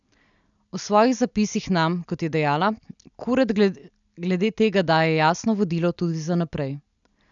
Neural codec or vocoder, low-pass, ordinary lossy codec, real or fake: none; 7.2 kHz; none; real